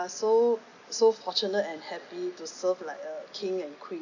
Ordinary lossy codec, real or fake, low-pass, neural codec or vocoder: none; real; 7.2 kHz; none